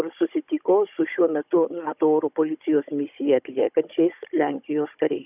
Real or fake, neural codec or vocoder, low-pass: fake; codec, 16 kHz, 16 kbps, FunCodec, trained on Chinese and English, 50 frames a second; 3.6 kHz